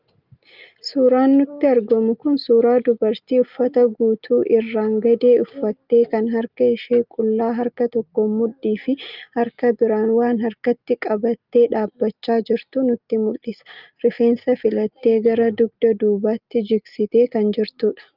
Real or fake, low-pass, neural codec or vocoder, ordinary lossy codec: real; 5.4 kHz; none; Opus, 24 kbps